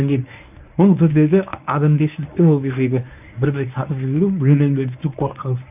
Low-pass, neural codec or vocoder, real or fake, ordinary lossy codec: 3.6 kHz; codec, 24 kHz, 0.9 kbps, WavTokenizer, medium speech release version 1; fake; none